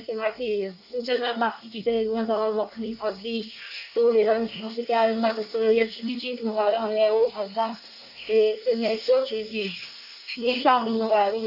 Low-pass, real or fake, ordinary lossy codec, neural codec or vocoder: 5.4 kHz; fake; none; codec, 24 kHz, 1 kbps, SNAC